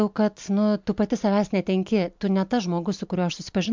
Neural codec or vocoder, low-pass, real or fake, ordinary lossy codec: none; 7.2 kHz; real; MP3, 64 kbps